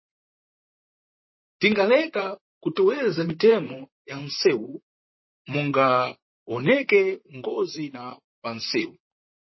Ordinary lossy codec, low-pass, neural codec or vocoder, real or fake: MP3, 24 kbps; 7.2 kHz; vocoder, 44.1 kHz, 128 mel bands, Pupu-Vocoder; fake